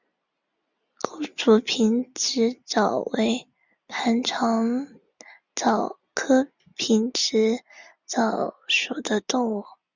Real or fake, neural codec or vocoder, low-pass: real; none; 7.2 kHz